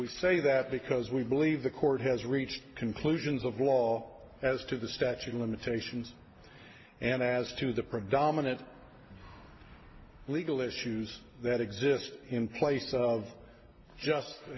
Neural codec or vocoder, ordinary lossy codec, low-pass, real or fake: none; MP3, 24 kbps; 7.2 kHz; real